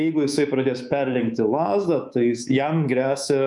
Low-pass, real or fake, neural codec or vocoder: 10.8 kHz; fake; codec, 24 kHz, 3.1 kbps, DualCodec